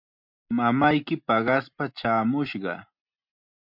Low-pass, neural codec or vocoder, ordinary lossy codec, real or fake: 5.4 kHz; none; MP3, 48 kbps; real